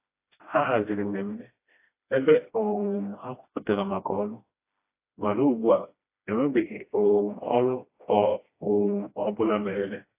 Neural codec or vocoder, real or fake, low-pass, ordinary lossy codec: codec, 16 kHz, 1 kbps, FreqCodec, smaller model; fake; 3.6 kHz; AAC, 24 kbps